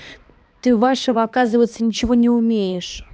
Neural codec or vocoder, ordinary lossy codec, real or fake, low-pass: codec, 16 kHz, 2 kbps, X-Codec, HuBERT features, trained on balanced general audio; none; fake; none